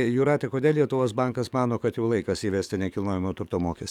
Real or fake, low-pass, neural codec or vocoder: fake; 19.8 kHz; codec, 44.1 kHz, 7.8 kbps, DAC